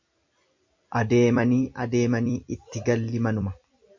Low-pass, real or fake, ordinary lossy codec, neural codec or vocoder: 7.2 kHz; fake; MP3, 64 kbps; vocoder, 44.1 kHz, 128 mel bands every 256 samples, BigVGAN v2